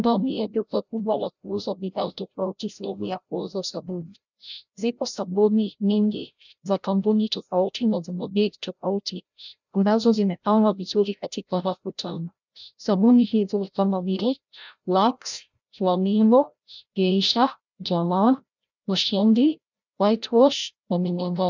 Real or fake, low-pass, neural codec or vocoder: fake; 7.2 kHz; codec, 16 kHz, 0.5 kbps, FreqCodec, larger model